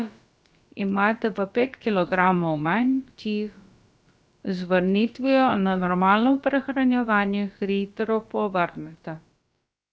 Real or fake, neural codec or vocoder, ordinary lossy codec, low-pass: fake; codec, 16 kHz, about 1 kbps, DyCAST, with the encoder's durations; none; none